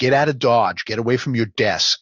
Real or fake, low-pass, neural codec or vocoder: real; 7.2 kHz; none